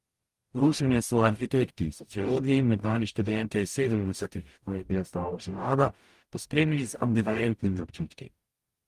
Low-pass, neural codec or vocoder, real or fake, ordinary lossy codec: 14.4 kHz; codec, 44.1 kHz, 0.9 kbps, DAC; fake; Opus, 32 kbps